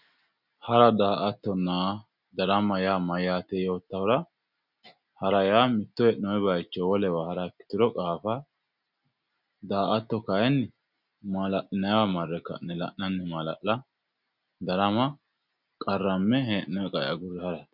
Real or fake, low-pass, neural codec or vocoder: real; 5.4 kHz; none